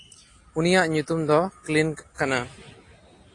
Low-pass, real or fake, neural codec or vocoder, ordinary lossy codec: 10.8 kHz; real; none; AAC, 48 kbps